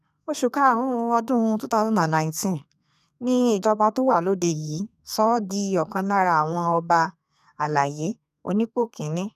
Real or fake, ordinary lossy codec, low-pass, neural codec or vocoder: fake; none; 14.4 kHz; codec, 32 kHz, 1.9 kbps, SNAC